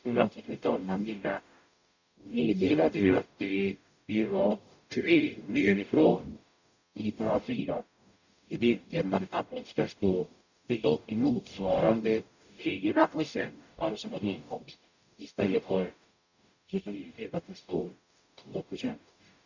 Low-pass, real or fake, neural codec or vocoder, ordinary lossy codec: 7.2 kHz; fake; codec, 44.1 kHz, 0.9 kbps, DAC; none